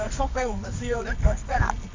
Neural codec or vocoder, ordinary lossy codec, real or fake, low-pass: codec, 24 kHz, 0.9 kbps, WavTokenizer, medium music audio release; MP3, 64 kbps; fake; 7.2 kHz